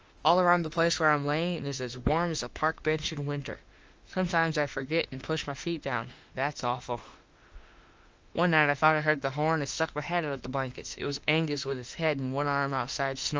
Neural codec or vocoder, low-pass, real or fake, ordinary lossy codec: autoencoder, 48 kHz, 32 numbers a frame, DAC-VAE, trained on Japanese speech; 7.2 kHz; fake; Opus, 24 kbps